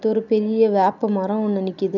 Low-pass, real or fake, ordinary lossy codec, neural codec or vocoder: 7.2 kHz; real; none; none